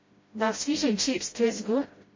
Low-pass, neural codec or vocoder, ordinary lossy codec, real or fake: 7.2 kHz; codec, 16 kHz, 0.5 kbps, FreqCodec, smaller model; MP3, 32 kbps; fake